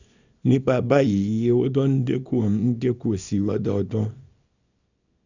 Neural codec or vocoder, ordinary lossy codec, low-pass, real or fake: codec, 24 kHz, 0.9 kbps, WavTokenizer, small release; none; 7.2 kHz; fake